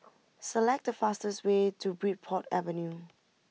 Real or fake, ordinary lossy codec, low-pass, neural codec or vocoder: real; none; none; none